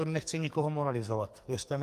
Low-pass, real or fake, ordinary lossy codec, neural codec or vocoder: 14.4 kHz; fake; Opus, 32 kbps; codec, 44.1 kHz, 2.6 kbps, SNAC